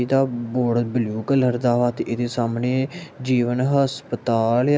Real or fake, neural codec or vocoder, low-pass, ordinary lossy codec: real; none; none; none